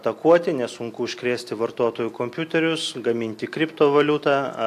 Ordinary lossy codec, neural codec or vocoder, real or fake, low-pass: AAC, 64 kbps; none; real; 14.4 kHz